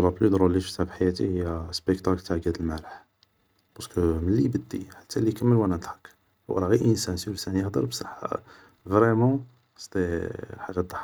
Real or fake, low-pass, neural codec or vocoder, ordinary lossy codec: real; none; none; none